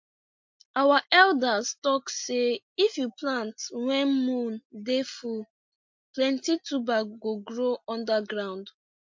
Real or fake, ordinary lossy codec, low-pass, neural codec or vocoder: real; MP3, 48 kbps; 7.2 kHz; none